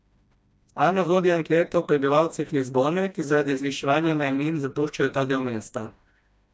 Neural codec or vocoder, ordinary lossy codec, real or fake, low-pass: codec, 16 kHz, 1 kbps, FreqCodec, smaller model; none; fake; none